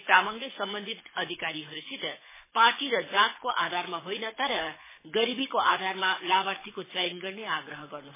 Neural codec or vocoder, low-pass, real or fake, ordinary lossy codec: codec, 24 kHz, 6 kbps, HILCodec; 3.6 kHz; fake; MP3, 16 kbps